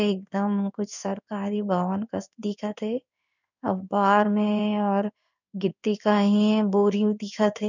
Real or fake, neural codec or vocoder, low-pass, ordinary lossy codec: fake; codec, 16 kHz in and 24 kHz out, 1 kbps, XY-Tokenizer; 7.2 kHz; none